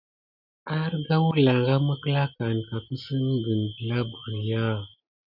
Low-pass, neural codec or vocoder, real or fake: 5.4 kHz; none; real